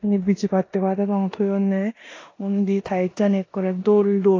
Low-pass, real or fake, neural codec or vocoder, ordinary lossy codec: 7.2 kHz; fake; codec, 16 kHz in and 24 kHz out, 0.9 kbps, LongCat-Audio-Codec, four codebook decoder; AAC, 32 kbps